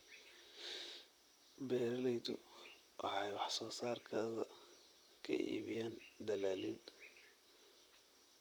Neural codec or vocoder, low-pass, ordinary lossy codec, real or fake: vocoder, 44.1 kHz, 128 mel bands, Pupu-Vocoder; none; none; fake